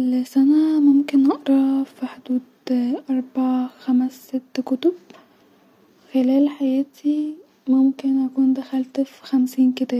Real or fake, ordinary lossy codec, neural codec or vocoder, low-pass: real; none; none; 19.8 kHz